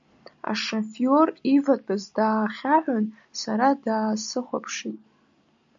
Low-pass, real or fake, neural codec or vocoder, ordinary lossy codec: 7.2 kHz; real; none; AAC, 64 kbps